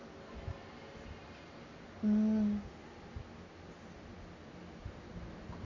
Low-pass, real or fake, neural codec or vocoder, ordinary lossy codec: 7.2 kHz; real; none; AAC, 48 kbps